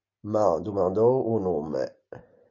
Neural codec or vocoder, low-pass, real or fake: none; 7.2 kHz; real